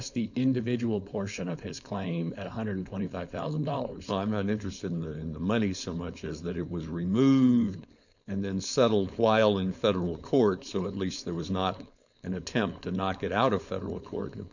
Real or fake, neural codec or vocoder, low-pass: fake; codec, 16 kHz, 4.8 kbps, FACodec; 7.2 kHz